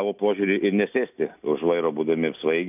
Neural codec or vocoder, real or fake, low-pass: none; real; 3.6 kHz